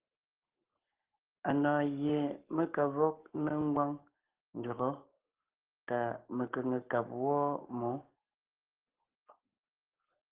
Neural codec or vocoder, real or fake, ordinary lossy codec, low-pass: codec, 44.1 kHz, 7.8 kbps, Pupu-Codec; fake; Opus, 16 kbps; 3.6 kHz